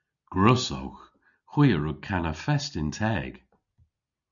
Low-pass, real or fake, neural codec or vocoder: 7.2 kHz; real; none